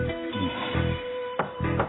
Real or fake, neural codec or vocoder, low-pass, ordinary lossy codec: fake; vocoder, 44.1 kHz, 128 mel bands every 512 samples, BigVGAN v2; 7.2 kHz; AAC, 16 kbps